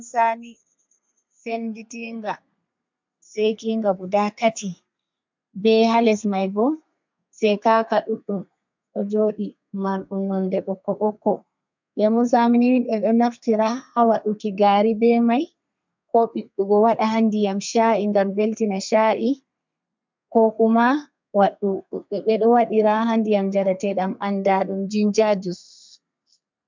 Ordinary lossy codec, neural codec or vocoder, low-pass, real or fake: MP3, 64 kbps; codec, 32 kHz, 1.9 kbps, SNAC; 7.2 kHz; fake